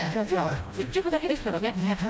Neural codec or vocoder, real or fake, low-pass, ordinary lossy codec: codec, 16 kHz, 0.5 kbps, FreqCodec, smaller model; fake; none; none